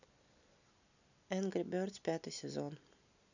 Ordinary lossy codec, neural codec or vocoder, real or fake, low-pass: none; none; real; 7.2 kHz